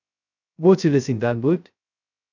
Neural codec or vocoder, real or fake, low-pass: codec, 16 kHz, 0.2 kbps, FocalCodec; fake; 7.2 kHz